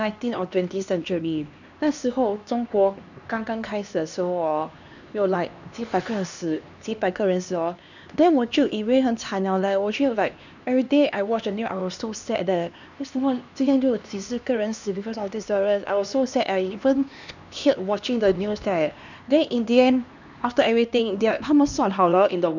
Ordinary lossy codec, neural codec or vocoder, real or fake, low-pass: none; codec, 16 kHz, 2 kbps, X-Codec, HuBERT features, trained on LibriSpeech; fake; 7.2 kHz